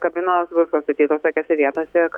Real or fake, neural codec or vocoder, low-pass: fake; autoencoder, 48 kHz, 128 numbers a frame, DAC-VAE, trained on Japanese speech; 19.8 kHz